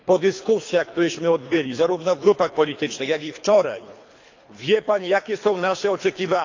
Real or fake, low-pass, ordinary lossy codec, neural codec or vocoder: fake; 7.2 kHz; AAC, 48 kbps; codec, 24 kHz, 3 kbps, HILCodec